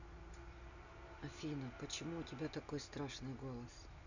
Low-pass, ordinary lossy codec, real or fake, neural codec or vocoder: 7.2 kHz; none; real; none